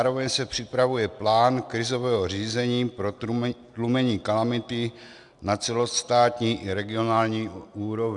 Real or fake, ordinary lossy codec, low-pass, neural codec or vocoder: real; Opus, 64 kbps; 10.8 kHz; none